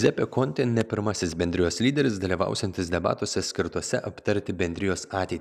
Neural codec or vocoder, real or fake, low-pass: none; real; 14.4 kHz